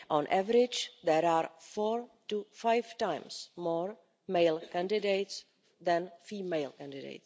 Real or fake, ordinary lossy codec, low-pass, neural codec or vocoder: real; none; none; none